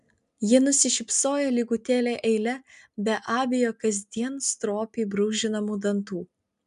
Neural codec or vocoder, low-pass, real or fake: none; 10.8 kHz; real